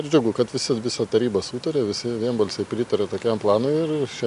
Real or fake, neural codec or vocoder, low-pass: real; none; 10.8 kHz